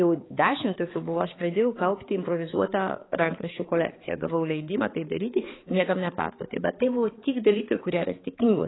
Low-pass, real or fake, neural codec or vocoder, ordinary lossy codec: 7.2 kHz; fake; codec, 16 kHz, 4 kbps, X-Codec, HuBERT features, trained on balanced general audio; AAC, 16 kbps